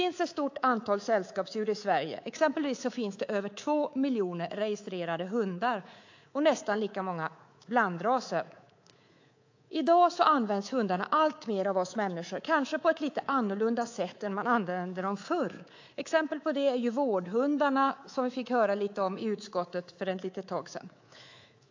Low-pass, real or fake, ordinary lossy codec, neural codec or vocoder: 7.2 kHz; fake; AAC, 48 kbps; codec, 24 kHz, 3.1 kbps, DualCodec